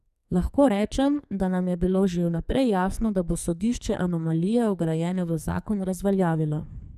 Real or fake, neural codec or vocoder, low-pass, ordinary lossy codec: fake; codec, 44.1 kHz, 2.6 kbps, SNAC; 14.4 kHz; none